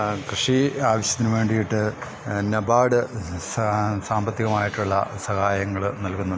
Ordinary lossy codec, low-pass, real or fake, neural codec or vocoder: none; none; real; none